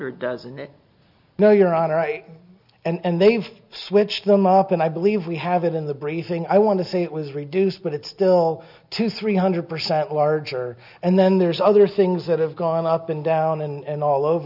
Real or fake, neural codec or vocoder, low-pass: real; none; 5.4 kHz